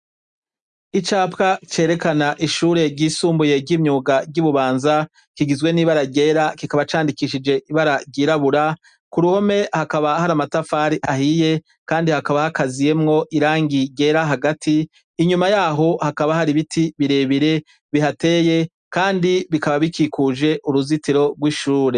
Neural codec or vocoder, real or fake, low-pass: none; real; 9.9 kHz